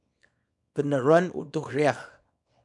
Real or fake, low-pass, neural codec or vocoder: fake; 10.8 kHz; codec, 24 kHz, 0.9 kbps, WavTokenizer, small release